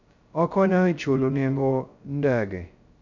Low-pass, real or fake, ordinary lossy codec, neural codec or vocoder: 7.2 kHz; fake; MP3, 64 kbps; codec, 16 kHz, 0.2 kbps, FocalCodec